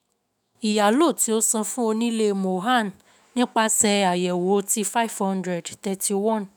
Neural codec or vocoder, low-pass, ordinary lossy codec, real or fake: autoencoder, 48 kHz, 128 numbers a frame, DAC-VAE, trained on Japanese speech; none; none; fake